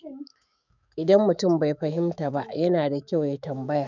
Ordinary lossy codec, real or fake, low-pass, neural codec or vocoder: none; fake; 7.2 kHz; autoencoder, 48 kHz, 128 numbers a frame, DAC-VAE, trained on Japanese speech